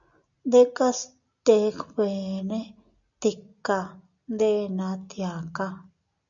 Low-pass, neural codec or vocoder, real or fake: 7.2 kHz; none; real